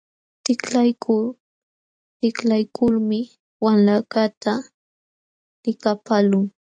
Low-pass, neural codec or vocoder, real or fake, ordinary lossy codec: 9.9 kHz; none; real; AAC, 64 kbps